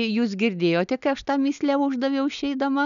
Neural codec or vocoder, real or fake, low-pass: none; real; 7.2 kHz